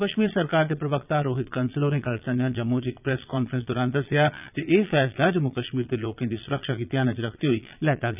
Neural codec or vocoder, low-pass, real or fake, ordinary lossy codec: vocoder, 22.05 kHz, 80 mel bands, Vocos; 3.6 kHz; fake; none